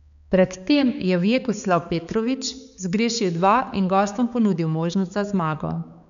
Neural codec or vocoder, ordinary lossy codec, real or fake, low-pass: codec, 16 kHz, 4 kbps, X-Codec, HuBERT features, trained on balanced general audio; none; fake; 7.2 kHz